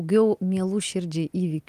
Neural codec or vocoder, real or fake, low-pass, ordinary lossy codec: none; real; 14.4 kHz; Opus, 32 kbps